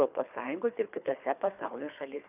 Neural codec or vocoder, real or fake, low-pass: codec, 24 kHz, 3 kbps, HILCodec; fake; 3.6 kHz